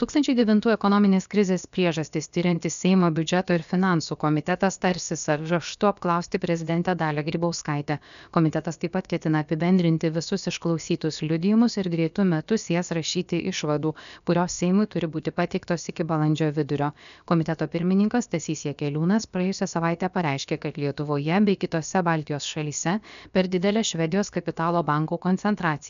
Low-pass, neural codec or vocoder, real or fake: 7.2 kHz; codec, 16 kHz, about 1 kbps, DyCAST, with the encoder's durations; fake